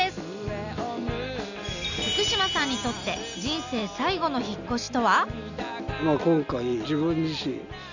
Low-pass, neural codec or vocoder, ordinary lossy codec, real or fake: 7.2 kHz; none; none; real